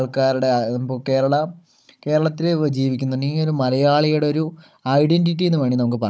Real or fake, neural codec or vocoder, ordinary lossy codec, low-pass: fake; codec, 16 kHz, 16 kbps, FunCodec, trained on Chinese and English, 50 frames a second; none; none